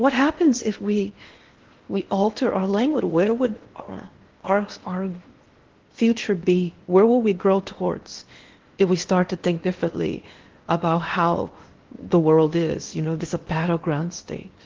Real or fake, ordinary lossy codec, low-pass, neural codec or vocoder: fake; Opus, 16 kbps; 7.2 kHz; codec, 16 kHz in and 24 kHz out, 0.8 kbps, FocalCodec, streaming, 65536 codes